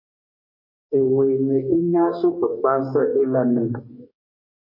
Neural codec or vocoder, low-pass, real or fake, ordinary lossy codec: codec, 44.1 kHz, 2.6 kbps, DAC; 5.4 kHz; fake; MP3, 24 kbps